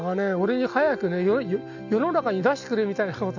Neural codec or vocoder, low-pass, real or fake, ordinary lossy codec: none; 7.2 kHz; real; none